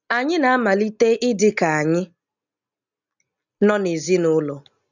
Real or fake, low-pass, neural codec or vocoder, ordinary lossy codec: real; 7.2 kHz; none; none